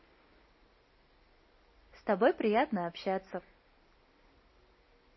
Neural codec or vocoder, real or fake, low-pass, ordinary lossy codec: none; real; 7.2 kHz; MP3, 24 kbps